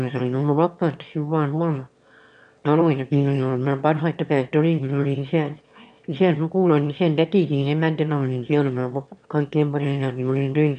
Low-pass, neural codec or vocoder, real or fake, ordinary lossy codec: 9.9 kHz; autoencoder, 22.05 kHz, a latent of 192 numbers a frame, VITS, trained on one speaker; fake; none